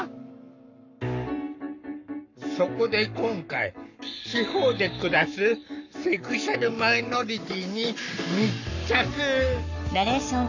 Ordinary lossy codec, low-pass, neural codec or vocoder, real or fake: none; 7.2 kHz; codec, 44.1 kHz, 7.8 kbps, Pupu-Codec; fake